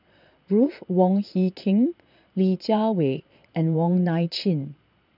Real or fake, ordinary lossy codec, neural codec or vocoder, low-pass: fake; none; vocoder, 22.05 kHz, 80 mel bands, Vocos; 5.4 kHz